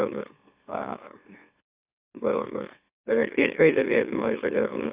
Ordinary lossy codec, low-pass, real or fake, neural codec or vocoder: Opus, 64 kbps; 3.6 kHz; fake; autoencoder, 44.1 kHz, a latent of 192 numbers a frame, MeloTTS